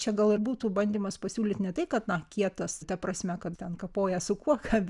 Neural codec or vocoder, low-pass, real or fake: none; 10.8 kHz; real